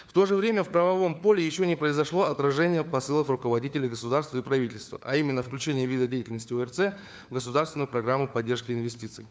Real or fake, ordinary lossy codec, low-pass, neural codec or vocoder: fake; none; none; codec, 16 kHz, 4 kbps, FunCodec, trained on LibriTTS, 50 frames a second